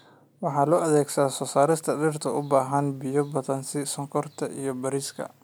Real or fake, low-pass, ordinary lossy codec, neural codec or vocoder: real; none; none; none